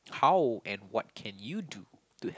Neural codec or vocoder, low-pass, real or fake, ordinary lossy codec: none; none; real; none